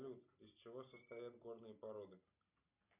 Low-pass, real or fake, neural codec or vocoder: 3.6 kHz; real; none